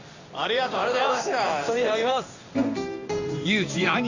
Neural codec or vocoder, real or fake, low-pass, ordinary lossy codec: codec, 16 kHz in and 24 kHz out, 1 kbps, XY-Tokenizer; fake; 7.2 kHz; none